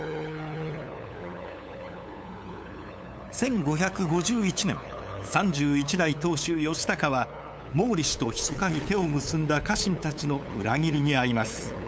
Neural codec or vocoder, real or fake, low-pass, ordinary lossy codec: codec, 16 kHz, 8 kbps, FunCodec, trained on LibriTTS, 25 frames a second; fake; none; none